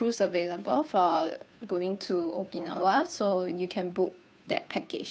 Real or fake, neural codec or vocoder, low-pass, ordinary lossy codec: fake; codec, 16 kHz, 2 kbps, FunCodec, trained on Chinese and English, 25 frames a second; none; none